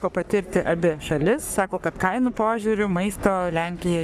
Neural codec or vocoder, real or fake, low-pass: codec, 44.1 kHz, 3.4 kbps, Pupu-Codec; fake; 14.4 kHz